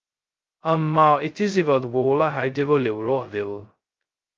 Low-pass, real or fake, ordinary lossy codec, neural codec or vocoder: 7.2 kHz; fake; Opus, 32 kbps; codec, 16 kHz, 0.2 kbps, FocalCodec